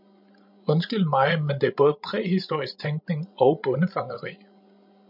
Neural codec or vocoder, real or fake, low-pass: codec, 16 kHz, 16 kbps, FreqCodec, larger model; fake; 5.4 kHz